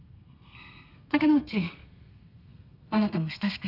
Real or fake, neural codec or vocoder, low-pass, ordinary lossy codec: fake; codec, 32 kHz, 1.9 kbps, SNAC; 5.4 kHz; none